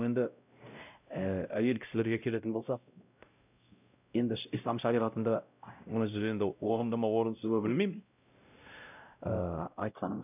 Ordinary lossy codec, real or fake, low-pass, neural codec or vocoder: none; fake; 3.6 kHz; codec, 16 kHz, 0.5 kbps, X-Codec, WavLM features, trained on Multilingual LibriSpeech